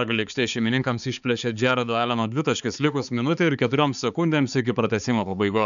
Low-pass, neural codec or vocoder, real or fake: 7.2 kHz; codec, 16 kHz, 4 kbps, X-Codec, HuBERT features, trained on balanced general audio; fake